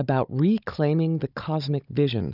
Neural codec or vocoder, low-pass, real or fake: codec, 16 kHz, 16 kbps, FunCodec, trained on Chinese and English, 50 frames a second; 5.4 kHz; fake